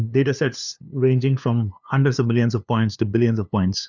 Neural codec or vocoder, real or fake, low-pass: codec, 16 kHz, 2 kbps, FunCodec, trained on LibriTTS, 25 frames a second; fake; 7.2 kHz